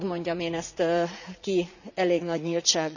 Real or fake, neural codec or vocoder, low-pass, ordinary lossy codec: fake; vocoder, 44.1 kHz, 80 mel bands, Vocos; 7.2 kHz; none